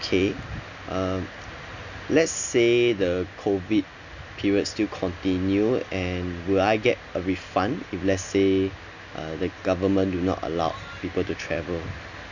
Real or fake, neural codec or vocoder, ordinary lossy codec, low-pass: real; none; none; 7.2 kHz